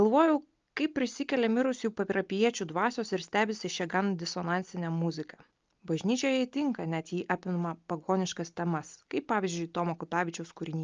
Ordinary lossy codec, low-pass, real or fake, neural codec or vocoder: Opus, 24 kbps; 7.2 kHz; real; none